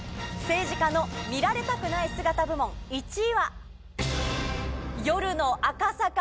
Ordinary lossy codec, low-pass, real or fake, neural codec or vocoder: none; none; real; none